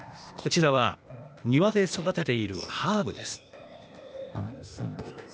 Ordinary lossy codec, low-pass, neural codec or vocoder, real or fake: none; none; codec, 16 kHz, 0.8 kbps, ZipCodec; fake